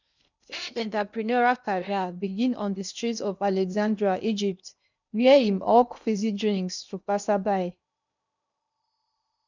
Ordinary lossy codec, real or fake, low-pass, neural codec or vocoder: none; fake; 7.2 kHz; codec, 16 kHz in and 24 kHz out, 0.8 kbps, FocalCodec, streaming, 65536 codes